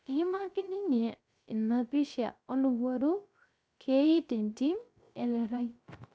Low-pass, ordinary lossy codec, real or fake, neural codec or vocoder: none; none; fake; codec, 16 kHz, 0.3 kbps, FocalCodec